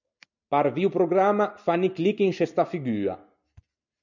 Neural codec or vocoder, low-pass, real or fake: none; 7.2 kHz; real